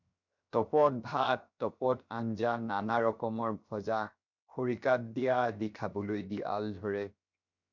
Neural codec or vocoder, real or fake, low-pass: codec, 16 kHz, 0.7 kbps, FocalCodec; fake; 7.2 kHz